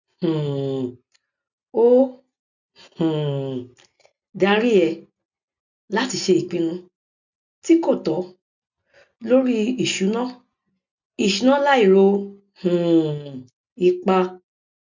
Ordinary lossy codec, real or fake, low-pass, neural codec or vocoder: none; real; 7.2 kHz; none